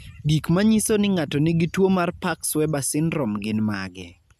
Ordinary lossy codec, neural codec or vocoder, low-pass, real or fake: none; none; 14.4 kHz; real